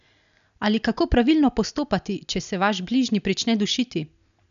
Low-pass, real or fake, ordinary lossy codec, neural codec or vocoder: 7.2 kHz; real; none; none